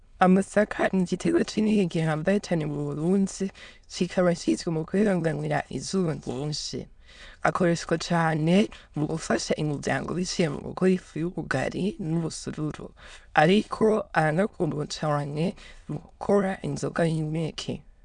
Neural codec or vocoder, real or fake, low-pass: autoencoder, 22.05 kHz, a latent of 192 numbers a frame, VITS, trained on many speakers; fake; 9.9 kHz